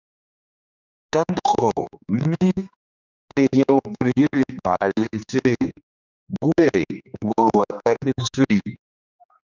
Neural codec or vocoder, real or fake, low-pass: codec, 16 kHz, 2 kbps, X-Codec, HuBERT features, trained on general audio; fake; 7.2 kHz